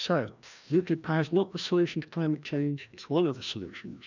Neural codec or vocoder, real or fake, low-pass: codec, 16 kHz, 1 kbps, FreqCodec, larger model; fake; 7.2 kHz